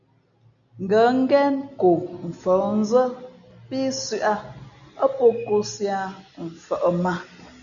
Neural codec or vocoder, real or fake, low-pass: none; real; 7.2 kHz